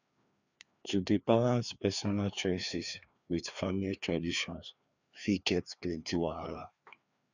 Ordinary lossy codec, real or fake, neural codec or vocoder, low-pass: none; fake; codec, 16 kHz, 2 kbps, FreqCodec, larger model; 7.2 kHz